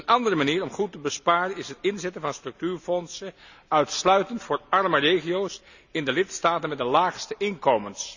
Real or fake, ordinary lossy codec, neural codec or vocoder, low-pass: real; none; none; 7.2 kHz